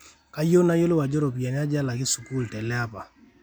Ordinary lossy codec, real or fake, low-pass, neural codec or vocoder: none; real; none; none